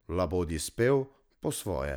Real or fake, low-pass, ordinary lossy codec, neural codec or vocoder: real; none; none; none